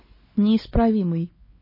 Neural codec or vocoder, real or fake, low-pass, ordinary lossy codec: codec, 16 kHz, 4 kbps, X-Codec, WavLM features, trained on Multilingual LibriSpeech; fake; 5.4 kHz; MP3, 24 kbps